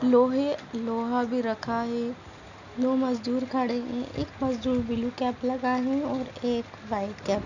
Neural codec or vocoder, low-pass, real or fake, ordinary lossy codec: none; 7.2 kHz; real; none